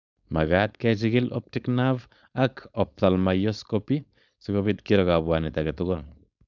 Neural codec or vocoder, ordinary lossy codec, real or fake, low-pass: codec, 16 kHz, 4.8 kbps, FACodec; none; fake; 7.2 kHz